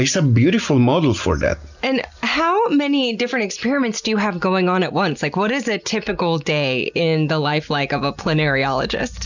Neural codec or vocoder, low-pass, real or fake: none; 7.2 kHz; real